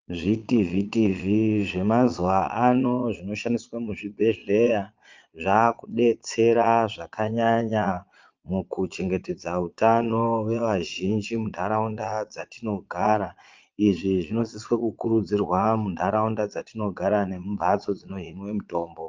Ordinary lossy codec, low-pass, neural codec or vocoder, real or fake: Opus, 24 kbps; 7.2 kHz; vocoder, 22.05 kHz, 80 mel bands, Vocos; fake